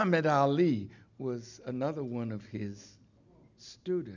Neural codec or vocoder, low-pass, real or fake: none; 7.2 kHz; real